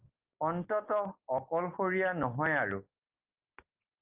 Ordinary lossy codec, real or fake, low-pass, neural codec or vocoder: Opus, 32 kbps; real; 3.6 kHz; none